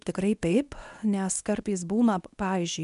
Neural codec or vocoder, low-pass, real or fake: codec, 24 kHz, 0.9 kbps, WavTokenizer, medium speech release version 1; 10.8 kHz; fake